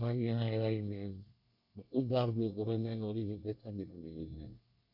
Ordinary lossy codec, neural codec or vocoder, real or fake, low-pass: none; codec, 24 kHz, 1 kbps, SNAC; fake; 5.4 kHz